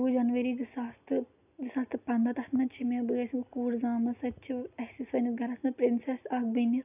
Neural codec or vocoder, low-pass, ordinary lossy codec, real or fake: none; 3.6 kHz; none; real